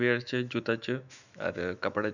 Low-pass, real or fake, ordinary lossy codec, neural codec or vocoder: 7.2 kHz; fake; none; autoencoder, 48 kHz, 128 numbers a frame, DAC-VAE, trained on Japanese speech